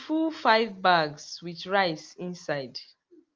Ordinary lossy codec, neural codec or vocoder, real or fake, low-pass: Opus, 32 kbps; none; real; 7.2 kHz